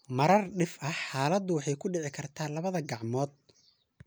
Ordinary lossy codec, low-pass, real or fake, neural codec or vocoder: none; none; real; none